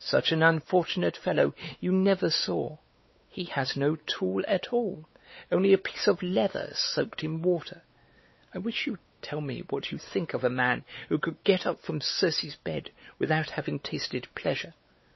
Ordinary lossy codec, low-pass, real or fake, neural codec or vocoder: MP3, 24 kbps; 7.2 kHz; fake; codec, 16 kHz, 4 kbps, X-Codec, WavLM features, trained on Multilingual LibriSpeech